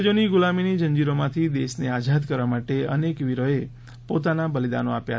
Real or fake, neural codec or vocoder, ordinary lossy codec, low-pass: real; none; none; none